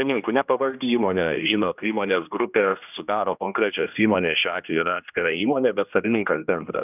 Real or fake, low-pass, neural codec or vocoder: fake; 3.6 kHz; codec, 16 kHz, 1 kbps, X-Codec, HuBERT features, trained on general audio